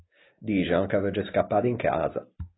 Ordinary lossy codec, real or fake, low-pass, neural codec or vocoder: AAC, 16 kbps; real; 7.2 kHz; none